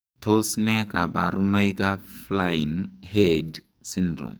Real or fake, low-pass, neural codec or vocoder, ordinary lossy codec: fake; none; codec, 44.1 kHz, 2.6 kbps, SNAC; none